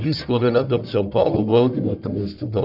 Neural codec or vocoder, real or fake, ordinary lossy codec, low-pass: codec, 44.1 kHz, 1.7 kbps, Pupu-Codec; fake; none; 5.4 kHz